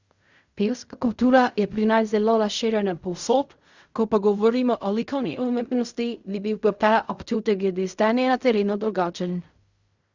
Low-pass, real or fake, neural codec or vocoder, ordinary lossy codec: 7.2 kHz; fake; codec, 16 kHz in and 24 kHz out, 0.4 kbps, LongCat-Audio-Codec, fine tuned four codebook decoder; Opus, 64 kbps